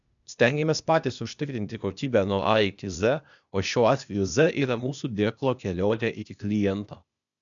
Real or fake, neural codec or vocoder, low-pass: fake; codec, 16 kHz, 0.8 kbps, ZipCodec; 7.2 kHz